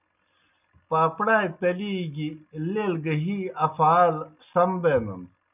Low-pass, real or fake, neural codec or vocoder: 3.6 kHz; real; none